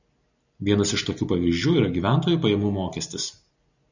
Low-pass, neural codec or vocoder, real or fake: 7.2 kHz; none; real